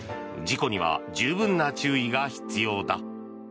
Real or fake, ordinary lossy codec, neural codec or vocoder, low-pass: real; none; none; none